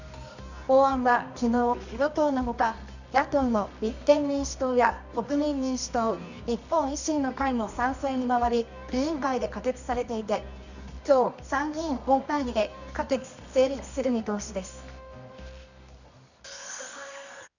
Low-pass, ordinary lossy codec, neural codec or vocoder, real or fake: 7.2 kHz; none; codec, 24 kHz, 0.9 kbps, WavTokenizer, medium music audio release; fake